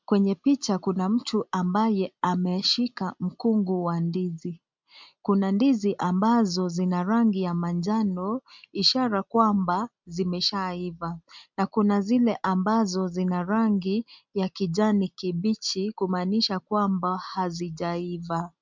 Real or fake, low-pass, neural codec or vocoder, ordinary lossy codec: real; 7.2 kHz; none; MP3, 64 kbps